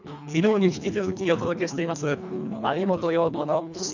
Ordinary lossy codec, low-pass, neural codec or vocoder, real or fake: none; 7.2 kHz; codec, 24 kHz, 1.5 kbps, HILCodec; fake